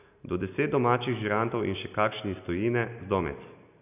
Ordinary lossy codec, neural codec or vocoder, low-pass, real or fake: none; none; 3.6 kHz; real